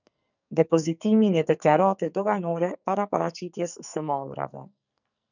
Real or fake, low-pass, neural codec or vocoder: fake; 7.2 kHz; codec, 44.1 kHz, 2.6 kbps, SNAC